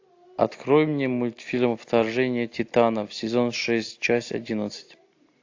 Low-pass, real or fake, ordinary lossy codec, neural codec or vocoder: 7.2 kHz; real; AAC, 48 kbps; none